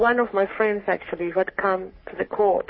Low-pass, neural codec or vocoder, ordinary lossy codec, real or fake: 7.2 kHz; codec, 44.1 kHz, 3.4 kbps, Pupu-Codec; MP3, 24 kbps; fake